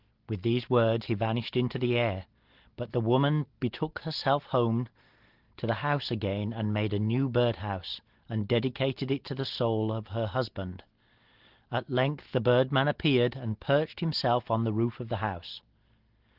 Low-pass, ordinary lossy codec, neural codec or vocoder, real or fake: 5.4 kHz; Opus, 16 kbps; none; real